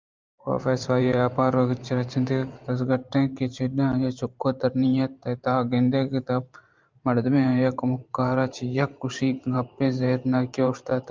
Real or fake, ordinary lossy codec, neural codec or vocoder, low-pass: fake; Opus, 24 kbps; vocoder, 44.1 kHz, 128 mel bands every 512 samples, BigVGAN v2; 7.2 kHz